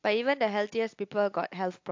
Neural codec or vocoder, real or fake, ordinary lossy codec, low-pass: none; real; AAC, 48 kbps; 7.2 kHz